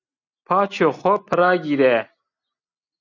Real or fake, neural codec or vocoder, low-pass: real; none; 7.2 kHz